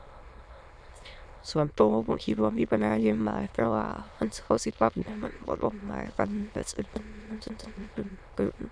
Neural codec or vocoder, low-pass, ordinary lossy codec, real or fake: autoencoder, 22.05 kHz, a latent of 192 numbers a frame, VITS, trained on many speakers; none; none; fake